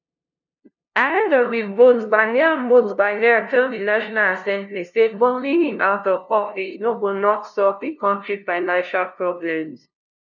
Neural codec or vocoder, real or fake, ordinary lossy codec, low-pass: codec, 16 kHz, 0.5 kbps, FunCodec, trained on LibriTTS, 25 frames a second; fake; none; 7.2 kHz